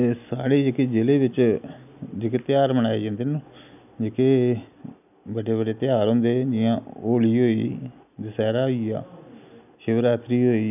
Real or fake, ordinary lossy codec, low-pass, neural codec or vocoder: real; none; 3.6 kHz; none